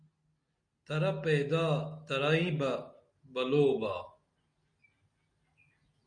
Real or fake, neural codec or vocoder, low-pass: real; none; 9.9 kHz